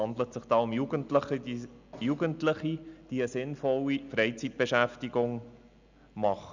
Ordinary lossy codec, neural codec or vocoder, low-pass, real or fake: none; none; 7.2 kHz; real